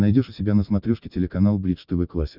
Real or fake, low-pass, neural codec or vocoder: real; 5.4 kHz; none